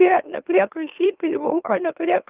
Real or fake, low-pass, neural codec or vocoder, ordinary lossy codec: fake; 3.6 kHz; autoencoder, 44.1 kHz, a latent of 192 numbers a frame, MeloTTS; Opus, 24 kbps